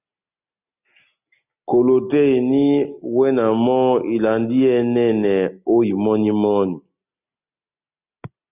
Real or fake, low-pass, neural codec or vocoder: real; 3.6 kHz; none